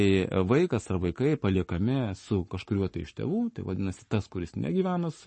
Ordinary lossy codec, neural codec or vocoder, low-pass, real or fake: MP3, 32 kbps; codec, 44.1 kHz, 7.8 kbps, Pupu-Codec; 10.8 kHz; fake